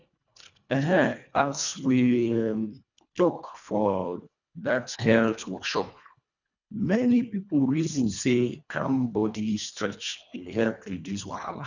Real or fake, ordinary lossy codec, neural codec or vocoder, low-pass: fake; none; codec, 24 kHz, 1.5 kbps, HILCodec; 7.2 kHz